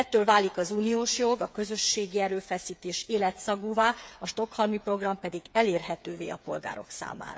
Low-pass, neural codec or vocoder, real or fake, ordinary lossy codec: none; codec, 16 kHz, 8 kbps, FreqCodec, smaller model; fake; none